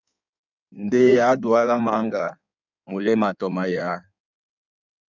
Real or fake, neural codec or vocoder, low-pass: fake; codec, 16 kHz in and 24 kHz out, 1.1 kbps, FireRedTTS-2 codec; 7.2 kHz